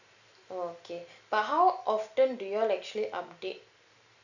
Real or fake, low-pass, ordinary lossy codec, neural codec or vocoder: real; 7.2 kHz; none; none